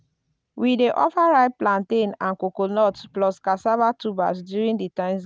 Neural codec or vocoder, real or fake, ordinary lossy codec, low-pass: none; real; none; none